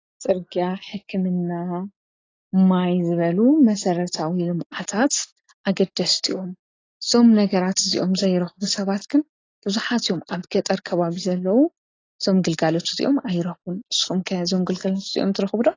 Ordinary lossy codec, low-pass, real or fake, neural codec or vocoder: AAC, 32 kbps; 7.2 kHz; real; none